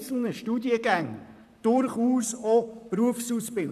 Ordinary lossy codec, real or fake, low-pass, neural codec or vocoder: none; fake; 14.4 kHz; vocoder, 44.1 kHz, 128 mel bands, Pupu-Vocoder